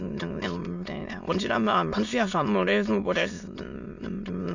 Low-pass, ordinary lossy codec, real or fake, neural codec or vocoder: 7.2 kHz; none; fake; autoencoder, 22.05 kHz, a latent of 192 numbers a frame, VITS, trained on many speakers